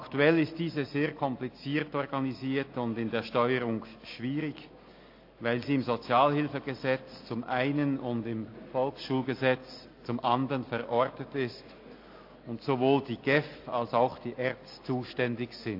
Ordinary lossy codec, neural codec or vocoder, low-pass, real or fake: AAC, 32 kbps; none; 5.4 kHz; real